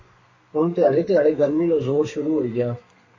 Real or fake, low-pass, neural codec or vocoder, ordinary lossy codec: fake; 7.2 kHz; codec, 32 kHz, 1.9 kbps, SNAC; MP3, 32 kbps